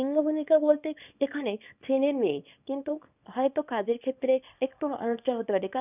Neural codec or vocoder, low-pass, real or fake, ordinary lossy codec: codec, 24 kHz, 0.9 kbps, WavTokenizer, small release; 3.6 kHz; fake; none